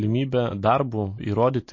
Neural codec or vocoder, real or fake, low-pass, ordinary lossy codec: none; real; 7.2 kHz; MP3, 32 kbps